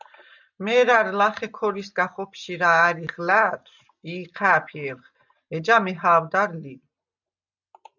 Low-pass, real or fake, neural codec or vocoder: 7.2 kHz; real; none